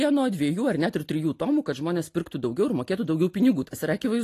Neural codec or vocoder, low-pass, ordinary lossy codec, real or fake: none; 14.4 kHz; AAC, 48 kbps; real